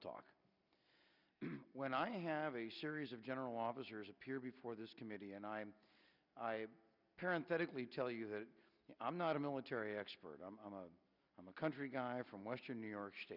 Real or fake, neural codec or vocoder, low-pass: real; none; 5.4 kHz